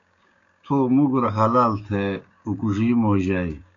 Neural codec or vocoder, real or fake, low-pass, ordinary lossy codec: codec, 16 kHz, 6 kbps, DAC; fake; 7.2 kHz; MP3, 48 kbps